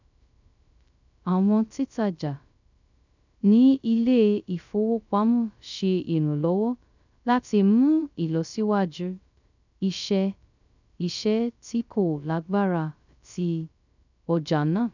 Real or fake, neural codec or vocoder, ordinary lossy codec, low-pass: fake; codec, 16 kHz, 0.2 kbps, FocalCodec; none; 7.2 kHz